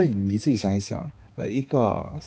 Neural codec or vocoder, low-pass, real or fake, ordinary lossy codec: codec, 16 kHz, 2 kbps, X-Codec, HuBERT features, trained on balanced general audio; none; fake; none